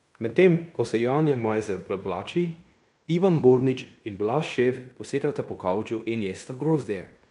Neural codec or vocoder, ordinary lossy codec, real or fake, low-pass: codec, 16 kHz in and 24 kHz out, 0.9 kbps, LongCat-Audio-Codec, fine tuned four codebook decoder; none; fake; 10.8 kHz